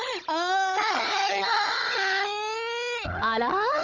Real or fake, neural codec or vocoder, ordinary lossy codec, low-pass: fake; codec, 16 kHz, 16 kbps, FunCodec, trained on Chinese and English, 50 frames a second; none; 7.2 kHz